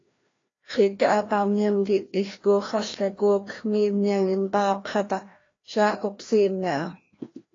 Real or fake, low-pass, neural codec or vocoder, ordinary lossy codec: fake; 7.2 kHz; codec, 16 kHz, 1 kbps, FreqCodec, larger model; AAC, 32 kbps